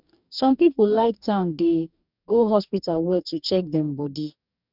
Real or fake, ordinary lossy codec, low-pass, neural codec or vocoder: fake; none; 5.4 kHz; codec, 44.1 kHz, 2.6 kbps, DAC